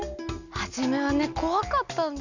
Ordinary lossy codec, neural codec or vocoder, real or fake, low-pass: none; none; real; 7.2 kHz